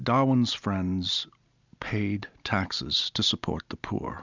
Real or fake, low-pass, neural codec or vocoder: real; 7.2 kHz; none